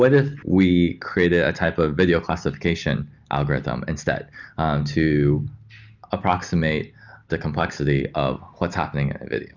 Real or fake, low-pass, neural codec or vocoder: real; 7.2 kHz; none